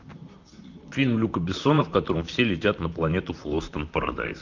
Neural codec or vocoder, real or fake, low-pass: vocoder, 44.1 kHz, 128 mel bands, Pupu-Vocoder; fake; 7.2 kHz